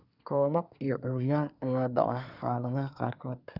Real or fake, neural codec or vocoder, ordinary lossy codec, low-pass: fake; codec, 24 kHz, 1 kbps, SNAC; none; 5.4 kHz